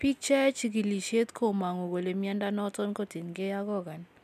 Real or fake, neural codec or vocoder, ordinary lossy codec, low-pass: real; none; none; 14.4 kHz